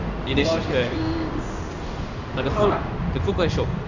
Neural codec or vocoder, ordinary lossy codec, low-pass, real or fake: codec, 16 kHz in and 24 kHz out, 1 kbps, XY-Tokenizer; none; 7.2 kHz; fake